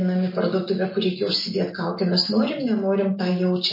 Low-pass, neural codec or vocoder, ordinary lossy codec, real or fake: 5.4 kHz; none; MP3, 24 kbps; real